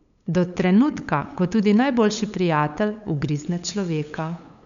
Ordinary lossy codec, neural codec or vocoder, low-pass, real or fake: none; codec, 16 kHz, 8 kbps, FunCodec, trained on LibriTTS, 25 frames a second; 7.2 kHz; fake